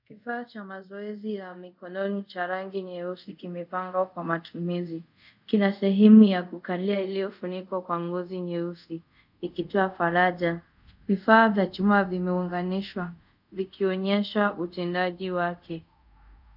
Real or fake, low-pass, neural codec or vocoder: fake; 5.4 kHz; codec, 24 kHz, 0.5 kbps, DualCodec